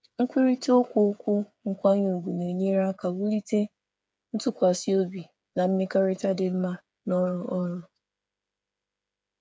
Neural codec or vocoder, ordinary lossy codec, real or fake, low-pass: codec, 16 kHz, 8 kbps, FreqCodec, smaller model; none; fake; none